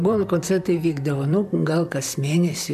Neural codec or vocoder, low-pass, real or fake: vocoder, 44.1 kHz, 128 mel bands, Pupu-Vocoder; 14.4 kHz; fake